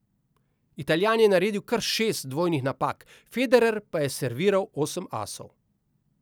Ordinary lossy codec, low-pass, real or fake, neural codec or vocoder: none; none; real; none